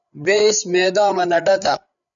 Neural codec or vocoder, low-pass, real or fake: codec, 16 kHz, 8 kbps, FreqCodec, larger model; 7.2 kHz; fake